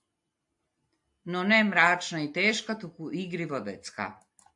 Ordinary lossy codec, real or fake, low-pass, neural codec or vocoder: AAC, 64 kbps; real; 10.8 kHz; none